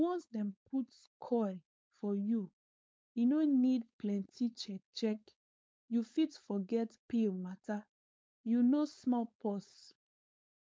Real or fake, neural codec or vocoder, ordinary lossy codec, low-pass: fake; codec, 16 kHz, 4.8 kbps, FACodec; none; none